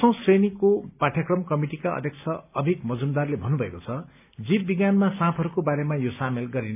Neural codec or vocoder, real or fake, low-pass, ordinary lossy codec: none; real; 3.6 kHz; AAC, 32 kbps